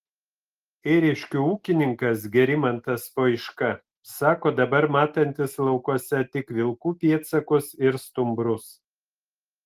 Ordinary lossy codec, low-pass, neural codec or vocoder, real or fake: Opus, 24 kbps; 14.4 kHz; none; real